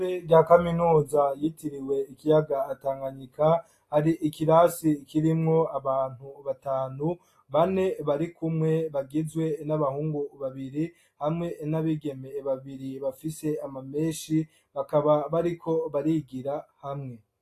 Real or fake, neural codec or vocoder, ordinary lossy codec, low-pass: real; none; AAC, 48 kbps; 14.4 kHz